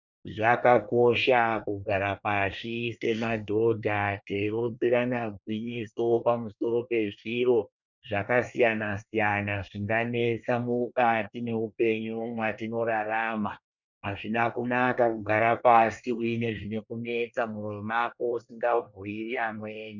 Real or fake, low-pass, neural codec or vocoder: fake; 7.2 kHz; codec, 24 kHz, 1 kbps, SNAC